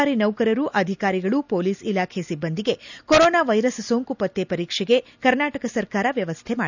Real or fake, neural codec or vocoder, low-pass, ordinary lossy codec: real; none; 7.2 kHz; none